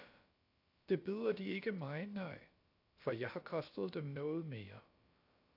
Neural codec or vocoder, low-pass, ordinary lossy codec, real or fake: codec, 16 kHz, about 1 kbps, DyCAST, with the encoder's durations; 5.4 kHz; AAC, 32 kbps; fake